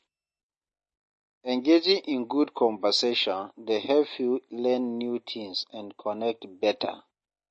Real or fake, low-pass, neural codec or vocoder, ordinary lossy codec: real; 9.9 kHz; none; MP3, 32 kbps